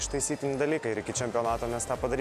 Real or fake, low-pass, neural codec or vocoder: real; 14.4 kHz; none